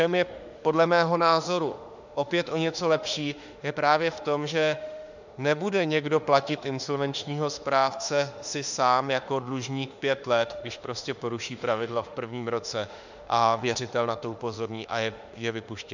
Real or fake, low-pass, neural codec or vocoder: fake; 7.2 kHz; autoencoder, 48 kHz, 32 numbers a frame, DAC-VAE, trained on Japanese speech